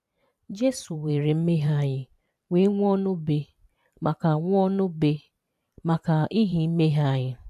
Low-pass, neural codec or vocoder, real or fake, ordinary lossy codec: 14.4 kHz; vocoder, 44.1 kHz, 128 mel bands every 512 samples, BigVGAN v2; fake; AAC, 96 kbps